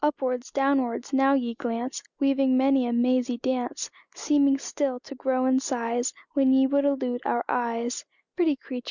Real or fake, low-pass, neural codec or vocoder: real; 7.2 kHz; none